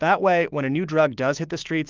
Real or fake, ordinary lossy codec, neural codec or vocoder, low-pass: fake; Opus, 32 kbps; codec, 16 kHz, 6 kbps, DAC; 7.2 kHz